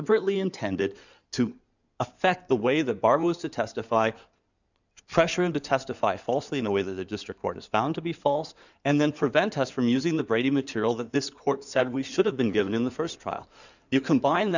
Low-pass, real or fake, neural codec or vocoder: 7.2 kHz; fake; codec, 16 kHz in and 24 kHz out, 2.2 kbps, FireRedTTS-2 codec